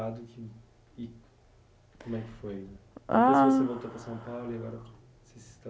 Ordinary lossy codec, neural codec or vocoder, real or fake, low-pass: none; none; real; none